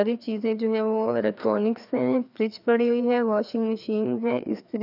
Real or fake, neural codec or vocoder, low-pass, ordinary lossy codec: fake; codec, 16 kHz, 2 kbps, FreqCodec, larger model; 5.4 kHz; none